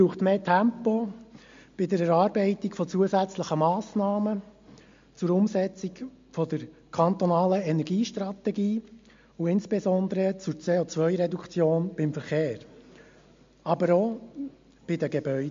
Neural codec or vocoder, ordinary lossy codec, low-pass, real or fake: none; MP3, 48 kbps; 7.2 kHz; real